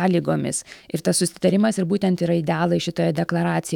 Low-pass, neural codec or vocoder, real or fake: 19.8 kHz; autoencoder, 48 kHz, 128 numbers a frame, DAC-VAE, trained on Japanese speech; fake